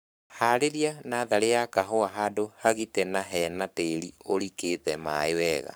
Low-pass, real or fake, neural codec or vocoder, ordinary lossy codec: none; fake; codec, 44.1 kHz, 7.8 kbps, Pupu-Codec; none